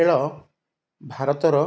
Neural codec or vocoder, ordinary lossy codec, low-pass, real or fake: none; none; none; real